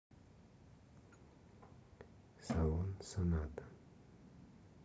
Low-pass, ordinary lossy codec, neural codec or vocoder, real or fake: none; none; none; real